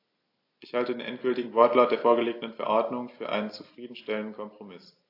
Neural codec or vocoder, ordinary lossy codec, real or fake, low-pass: none; AAC, 32 kbps; real; 5.4 kHz